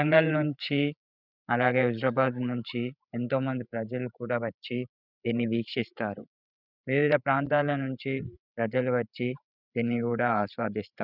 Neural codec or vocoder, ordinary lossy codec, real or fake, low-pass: codec, 16 kHz, 8 kbps, FreqCodec, larger model; none; fake; 5.4 kHz